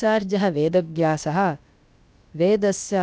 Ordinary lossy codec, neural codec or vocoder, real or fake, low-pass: none; codec, 16 kHz, about 1 kbps, DyCAST, with the encoder's durations; fake; none